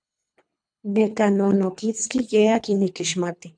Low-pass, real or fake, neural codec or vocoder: 9.9 kHz; fake; codec, 24 kHz, 3 kbps, HILCodec